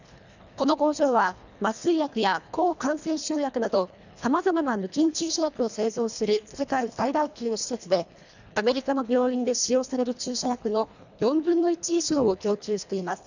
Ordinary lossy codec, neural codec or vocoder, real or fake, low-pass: none; codec, 24 kHz, 1.5 kbps, HILCodec; fake; 7.2 kHz